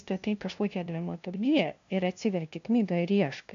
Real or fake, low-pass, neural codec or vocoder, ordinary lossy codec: fake; 7.2 kHz; codec, 16 kHz, 0.5 kbps, FunCodec, trained on LibriTTS, 25 frames a second; AAC, 64 kbps